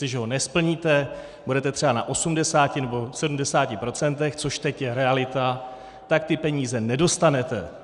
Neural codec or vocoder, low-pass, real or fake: none; 10.8 kHz; real